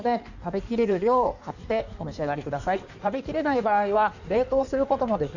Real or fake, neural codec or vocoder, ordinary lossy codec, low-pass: fake; codec, 16 kHz in and 24 kHz out, 1.1 kbps, FireRedTTS-2 codec; none; 7.2 kHz